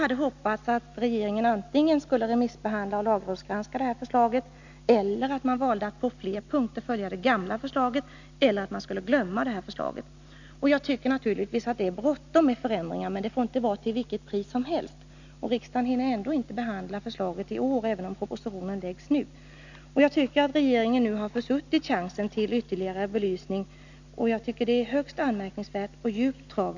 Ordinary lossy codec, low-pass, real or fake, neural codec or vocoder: none; 7.2 kHz; real; none